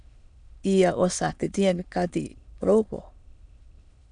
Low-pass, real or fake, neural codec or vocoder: 9.9 kHz; fake; autoencoder, 22.05 kHz, a latent of 192 numbers a frame, VITS, trained on many speakers